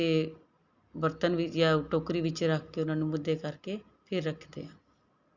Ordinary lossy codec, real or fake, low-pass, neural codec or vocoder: Opus, 64 kbps; real; 7.2 kHz; none